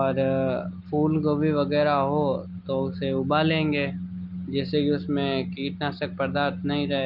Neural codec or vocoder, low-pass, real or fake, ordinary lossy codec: none; 5.4 kHz; real; Opus, 24 kbps